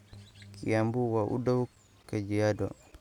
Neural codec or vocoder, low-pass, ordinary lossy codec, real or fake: none; 19.8 kHz; none; real